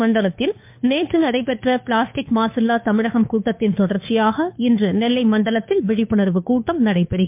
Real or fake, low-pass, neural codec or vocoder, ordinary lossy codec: fake; 3.6 kHz; codec, 16 kHz, 2 kbps, FunCodec, trained on Chinese and English, 25 frames a second; MP3, 32 kbps